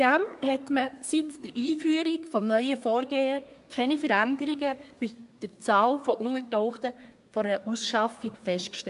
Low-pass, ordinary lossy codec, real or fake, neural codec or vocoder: 10.8 kHz; AAC, 96 kbps; fake; codec, 24 kHz, 1 kbps, SNAC